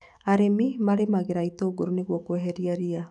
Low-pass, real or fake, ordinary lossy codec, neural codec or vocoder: 10.8 kHz; fake; none; autoencoder, 48 kHz, 128 numbers a frame, DAC-VAE, trained on Japanese speech